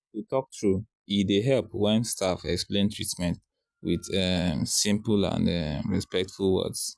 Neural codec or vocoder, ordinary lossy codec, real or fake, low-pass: none; none; real; none